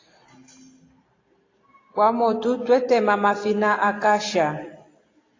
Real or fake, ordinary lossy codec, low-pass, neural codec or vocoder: real; AAC, 32 kbps; 7.2 kHz; none